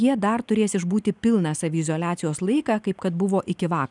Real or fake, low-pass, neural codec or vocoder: real; 10.8 kHz; none